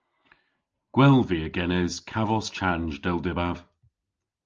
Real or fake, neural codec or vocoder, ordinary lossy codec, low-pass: real; none; Opus, 24 kbps; 7.2 kHz